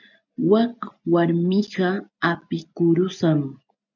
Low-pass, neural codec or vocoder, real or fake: 7.2 kHz; none; real